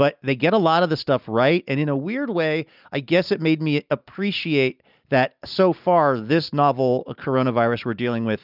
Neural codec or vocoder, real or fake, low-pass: none; real; 5.4 kHz